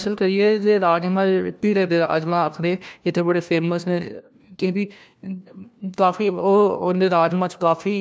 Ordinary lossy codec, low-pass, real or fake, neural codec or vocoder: none; none; fake; codec, 16 kHz, 1 kbps, FunCodec, trained on LibriTTS, 50 frames a second